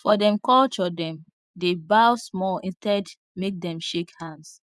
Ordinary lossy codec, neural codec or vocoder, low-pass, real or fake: none; vocoder, 24 kHz, 100 mel bands, Vocos; none; fake